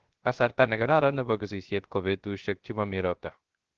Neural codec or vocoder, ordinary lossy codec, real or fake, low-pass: codec, 16 kHz, 0.3 kbps, FocalCodec; Opus, 16 kbps; fake; 7.2 kHz